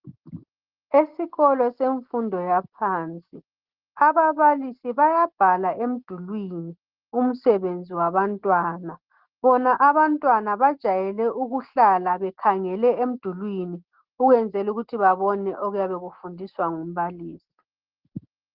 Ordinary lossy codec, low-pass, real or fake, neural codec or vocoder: Opus, 16 kbps; 5.4 kHz; real; none